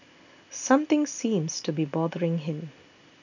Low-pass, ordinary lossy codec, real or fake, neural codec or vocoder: 7.2 kHz; none; real; none